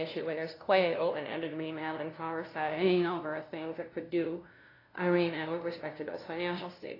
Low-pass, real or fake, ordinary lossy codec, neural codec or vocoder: 5.4 kHz; fake; AAC, 24 kbps; codec, 16 kHz, 0.5 kbps, FunCodec, trained on LibriTTS, 25 frames a second